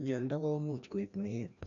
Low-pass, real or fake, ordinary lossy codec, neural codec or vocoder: 7.2 kHz; fake; none; codec, 16 kHz, 1 kbps, FreqCodec, larger model